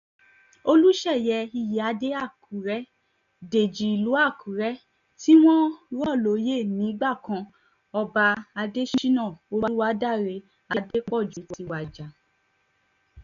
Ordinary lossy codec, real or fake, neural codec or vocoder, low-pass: none; real; none; 7.2 kHz